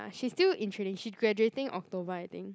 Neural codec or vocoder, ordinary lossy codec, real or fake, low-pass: none; none; real; none